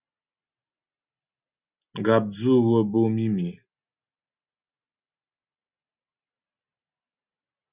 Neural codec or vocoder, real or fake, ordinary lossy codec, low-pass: none; real; Opus, 64 kbps; 3.6 kHz